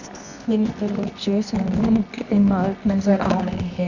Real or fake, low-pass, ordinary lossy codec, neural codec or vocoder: fake; 7.2 kHz; none; codec, 24 kHz, 0.9 kbps, WavTokenizer, medium music audio release